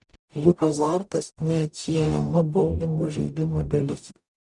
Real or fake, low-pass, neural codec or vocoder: fake; 10.8 kHz; codec, 44.1 kHz, 0.9 kbps, DAC